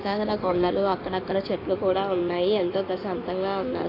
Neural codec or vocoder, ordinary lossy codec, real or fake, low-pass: codec, 16 kHz in and 24 kHz out, 2.2 kbps, FireRedTTS-2 codec; AAC, 24 kbps; fake; 5.4 kHz